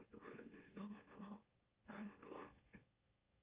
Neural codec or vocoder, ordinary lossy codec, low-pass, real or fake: autoencoder, 44.1 kHz, a latent of 192 numbers a frame, MeloTTS; Opus, 32 kbps; 3.6 kHz; fake